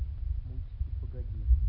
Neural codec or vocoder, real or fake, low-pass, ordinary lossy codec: none; real; 5.4 kHz; none